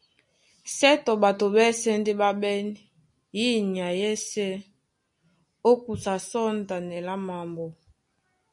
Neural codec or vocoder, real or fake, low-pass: none; real; 10.8 kHz